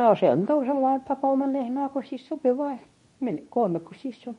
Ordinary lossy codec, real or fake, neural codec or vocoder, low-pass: MP3, 48 kbps; fake; codec, 24 kHz, 0.9 kbps, WavTokenizer, medium speech release version 2; 10.8 kHz